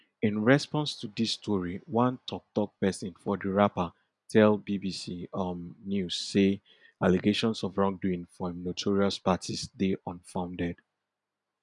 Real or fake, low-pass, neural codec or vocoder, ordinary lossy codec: real; 10.8 kHz; none; none